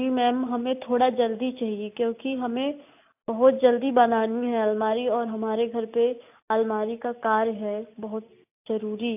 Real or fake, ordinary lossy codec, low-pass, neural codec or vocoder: real; AAC, 32 kbps; 3.6 kHz; none